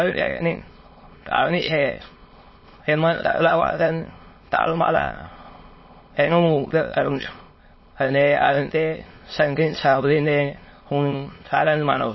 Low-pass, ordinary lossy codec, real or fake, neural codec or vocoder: 7.2 kHz; MP3, 24 kbps; fake; autoencoder, 22.05 kHz, a latent of 192 numbers a frame, VITS, trained on many speakers